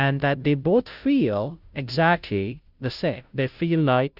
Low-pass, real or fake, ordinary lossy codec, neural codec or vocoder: 5.4 kHz; fake; Opus, 64 kbps; codec, 16 kHz, 0.5 kbps, FunCodec, trained on Chinese and English, 25 frames a second